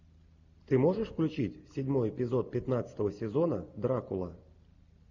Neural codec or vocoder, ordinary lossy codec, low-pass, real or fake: none; AAC, 48 kbps; 7.2 kHz; real